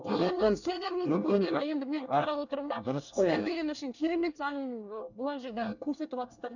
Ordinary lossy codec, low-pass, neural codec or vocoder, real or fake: none; 7.2 kHz; codec, 24 kHz, 1 kbps, SNAC; fake